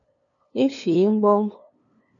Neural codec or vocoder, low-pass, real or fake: codec, 16 kHz, 2 kbps, FunCodec, trained on LibriTTS, 25 frames a second; 7.2 kHz; fake